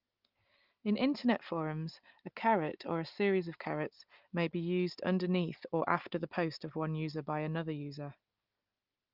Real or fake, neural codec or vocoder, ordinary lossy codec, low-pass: real; none; Opus, 24 kbps; 5.4 kHz